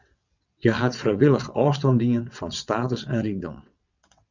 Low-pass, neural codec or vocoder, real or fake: 7.2 kHz; vocoder, 22.05 kHz, 80 mel bands, WaveNeXt; fake